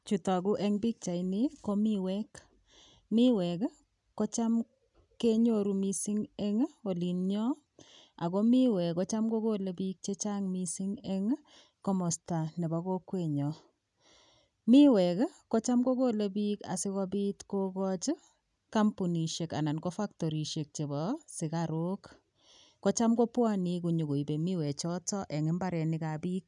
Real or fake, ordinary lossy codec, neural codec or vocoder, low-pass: real; none; none; 10.8 kHz